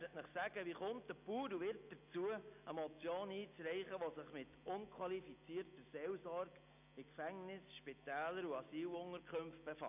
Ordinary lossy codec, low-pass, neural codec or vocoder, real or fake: none; 3.6 kHz; none; real